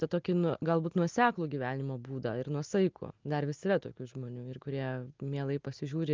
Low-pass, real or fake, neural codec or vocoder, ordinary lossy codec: 7.2 kHz; real; none; Opus, 24 kbps